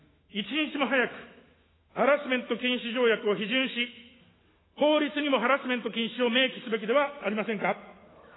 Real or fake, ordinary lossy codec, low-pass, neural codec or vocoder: fake; AAC, 16 kbps; 7.2 kHz; autoencoder, 48 kHz, 128 numbers a frame, DAC-VAE, trained on Japanese speech